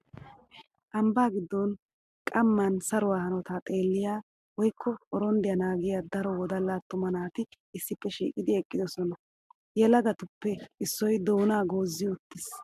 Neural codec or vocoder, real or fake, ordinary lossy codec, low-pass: none; real; MP3, 96 kbps; 14.4 kHz